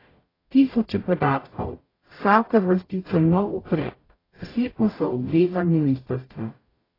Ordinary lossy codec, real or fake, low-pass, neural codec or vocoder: AAC, 24 kbps; fake; 5.4 kHz; codec, 44.1 kHz, 0.9 kbps, DAC